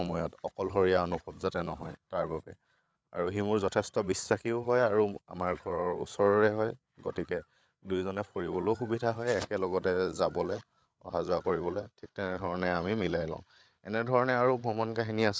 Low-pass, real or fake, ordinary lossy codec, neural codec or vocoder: none; fake; none; codec, 16 kHz, 8 kbps, FreqCodec, larger model